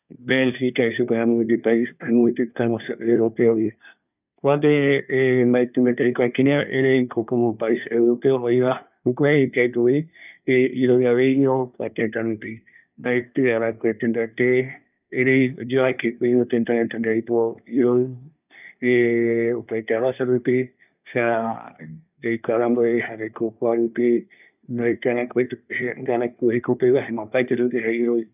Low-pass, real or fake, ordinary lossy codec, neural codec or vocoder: 3.6 kHz; fake; none; codec, 24 kHz, 1 kbps, SNAC